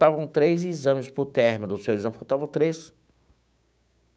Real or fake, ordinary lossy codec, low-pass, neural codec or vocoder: fake; none; none; codec, 16 kHz, 6 kbps, DAC